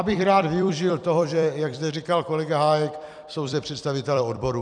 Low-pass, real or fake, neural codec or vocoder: 9.9 kHz; fake; vocoder, 44.1 kHz, 128 mel bands every 256 samples, BigVGAN v2